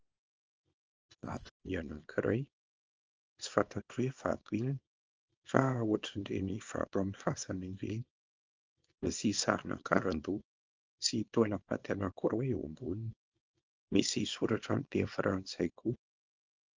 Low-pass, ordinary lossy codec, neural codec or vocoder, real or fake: 7.2 kHz; Opus, 24 kbps; codec, 24 kHz, 0.9 kbps, WavTokenizer, small release; fake